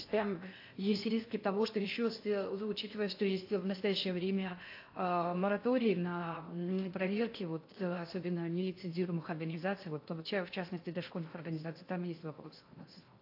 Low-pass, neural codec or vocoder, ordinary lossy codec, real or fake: 5.4 kHz; codec, 16 kHz in and 24 kHz out, 0.6 kbps, FocalCodec, streaming, 4096 codes; AAC, 32 kbps; fake